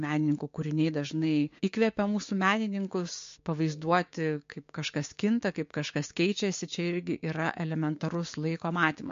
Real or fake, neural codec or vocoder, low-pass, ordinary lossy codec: fake; codec, 16 kHz, 6 kbps, DAC; 7.2 kHz; MP3, 48 kbps